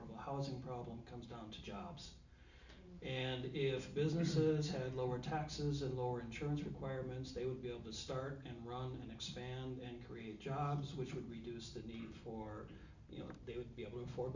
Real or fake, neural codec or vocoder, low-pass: real; none; 7.2 kHz